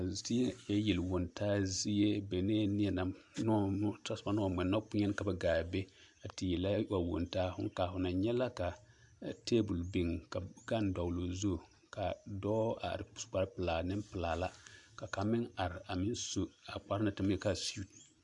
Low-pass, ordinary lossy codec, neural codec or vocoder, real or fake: 10.8 kHz; AAC, 64 kbps; none; real